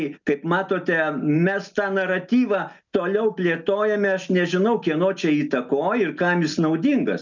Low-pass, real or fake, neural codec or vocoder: 7.2 kHz; real; none